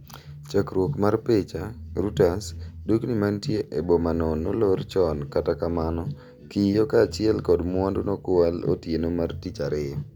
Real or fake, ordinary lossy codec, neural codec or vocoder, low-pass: real; none; none; 19.8 kHz